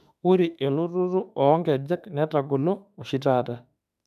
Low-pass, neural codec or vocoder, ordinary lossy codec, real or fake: 14.4 kHz; autoencoder, 48 kHz, 32 numbers a frame, DAC-VAE, trained on Japanese speech; none; fake